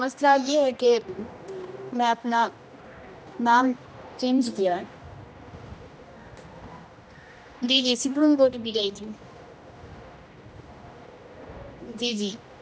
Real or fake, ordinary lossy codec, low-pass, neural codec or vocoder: fake; none; none; codec, 16 kHz, 1 kbps, X-Codec, HuBERT features, trained on general audio